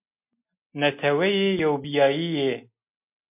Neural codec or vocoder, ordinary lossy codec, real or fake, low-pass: none; MP3, 32 kbps; real; 3.6 kHz